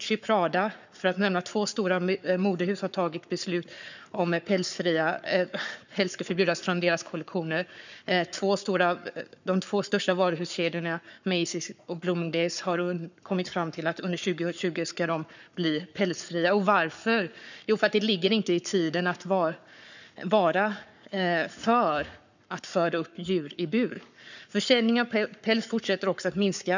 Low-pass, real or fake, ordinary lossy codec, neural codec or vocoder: 7.2 kHz; fake; none; codec, 44.1 kHz, 7.8 kbps, Pupu-Codec